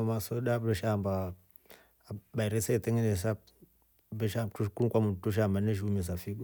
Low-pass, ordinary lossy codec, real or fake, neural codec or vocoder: none; none; real; none